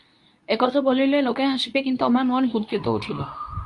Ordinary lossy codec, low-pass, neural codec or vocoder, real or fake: Opus, 64 kbps; 10.8 kHz; codec, 24 kHz, 0.9 kbps, WavTokenizer, medium speech release version 2; fake